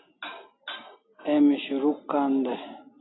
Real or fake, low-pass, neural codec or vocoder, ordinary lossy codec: real; 7.2 kHz; none; AAC, 16 kbps